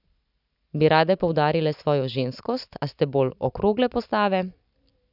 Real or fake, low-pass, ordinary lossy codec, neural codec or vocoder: real; 5.4 kHz; none; none